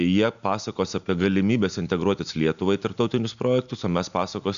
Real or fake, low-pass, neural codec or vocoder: real; 7.2 kHz; none